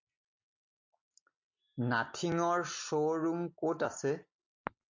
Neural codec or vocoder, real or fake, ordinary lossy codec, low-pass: none; real; MP3, 48 kbps; 7.2 kHz